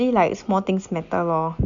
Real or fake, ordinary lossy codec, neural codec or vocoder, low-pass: real; none; none; 7.2 kHz